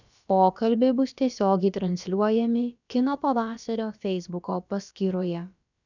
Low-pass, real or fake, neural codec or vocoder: 7.2 kHz; fake; codec, 16 kHz, about 1 kbps, DyCAST, with the encoder's durations